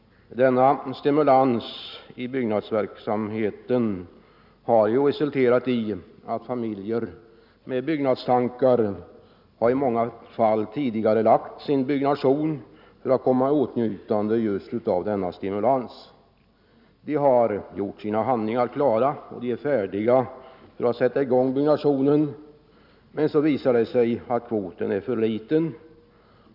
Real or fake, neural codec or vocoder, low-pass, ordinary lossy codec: real; none; 5.4 kHz; none